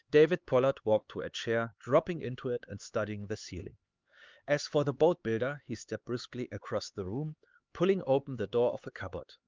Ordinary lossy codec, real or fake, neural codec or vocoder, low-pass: Opus, 32 kbps; fake; codec, 16 kHz, 4 kbps, X-Codec, HuBERT features, trained on LibriSpeech; 7.2 kHz